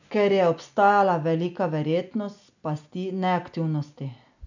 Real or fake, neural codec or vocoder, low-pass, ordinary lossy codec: real; none; 7.2 kHz; none